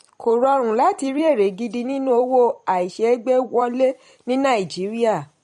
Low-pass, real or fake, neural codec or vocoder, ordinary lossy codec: 19.8 kHz; fake; vocoder, 44.1 kHz, 128 mel bands every 256 samples, BigVGAN v2; MP3, 48 kbps